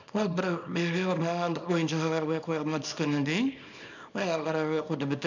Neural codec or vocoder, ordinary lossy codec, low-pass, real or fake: codec, 24 kHz, 0.9 kbps, WavTokenizer, small release; none; 7.2 kHz; fake